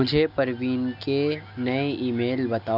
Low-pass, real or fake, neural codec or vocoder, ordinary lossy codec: 5.4 kHz; real; none; none